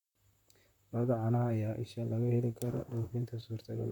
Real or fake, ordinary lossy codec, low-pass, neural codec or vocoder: fake; none; 19.8 kHz; vocoder, 44.1 kHz, 128 mel bands, Pupu-Vocoder